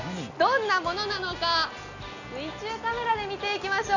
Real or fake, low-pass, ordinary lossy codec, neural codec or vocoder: real; 7.2 kHz; none; none